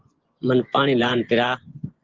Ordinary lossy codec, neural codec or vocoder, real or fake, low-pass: Opus, 16 kbps; vocoder, 24 kHz, 100 mel bands, Vocos; fake; 7.2 kHz